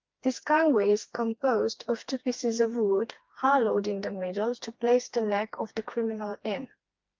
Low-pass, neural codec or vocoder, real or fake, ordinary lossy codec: 7.2 kHz; codec, 16 kHz, 2 kbps, FreqCodec, smaller model; fake; Opus, 32 kbps